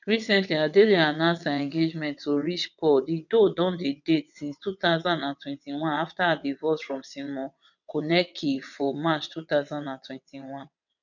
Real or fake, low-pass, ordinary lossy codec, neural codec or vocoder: fake; 7.2 kHz; none; vocoder, 22.05 kHz, 80 mel bands, WaveNeXt